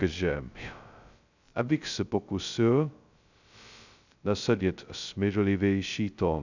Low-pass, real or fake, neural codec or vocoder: 7.2 kHz; fake; codec, 16 kHz, 0.2 kbps, FocalCodec